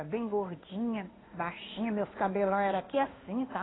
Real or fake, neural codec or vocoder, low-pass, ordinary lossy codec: fake; codec, 16 kHz in and 24 kHz out, 1 kbps, XY-Tokenizer; 7.2 kHz; AAC, 16 kbps